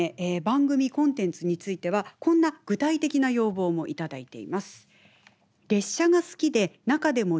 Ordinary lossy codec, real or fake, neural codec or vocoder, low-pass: none; real; none; none